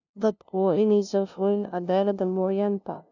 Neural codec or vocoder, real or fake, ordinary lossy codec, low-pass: codec, 16 kHz, 0.5 kbps, FunCodec, trained on LibriTTS, 25 frames a second; fake; none; 7.2 kHz